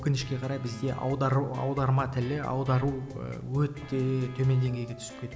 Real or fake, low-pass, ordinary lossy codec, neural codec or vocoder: real; none; none; none